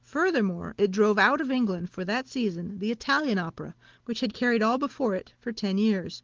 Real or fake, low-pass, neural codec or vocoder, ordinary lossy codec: real; 7.2 kHz; none; Opus, 16 kbps